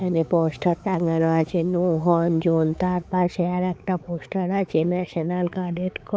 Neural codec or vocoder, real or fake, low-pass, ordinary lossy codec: codec, 16 kHz, 4 kbps, X-Codec, HuBERT features, trained on balanced general audio; fake; none; none